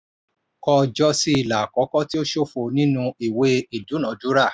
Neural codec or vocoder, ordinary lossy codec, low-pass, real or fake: none; none; none; real